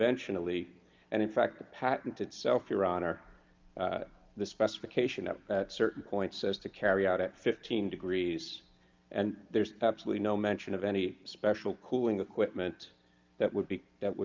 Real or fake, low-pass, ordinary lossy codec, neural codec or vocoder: real; 7.2 kHz; Opus, 32 kbps; none